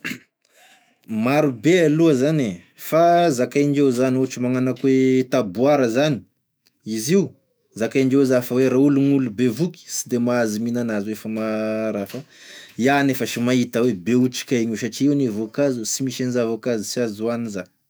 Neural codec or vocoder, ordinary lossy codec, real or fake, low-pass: autoencoder, 48 kHz, 128 numbers a frame, DAC-VAE, trained on Japanese speech; none; fake; none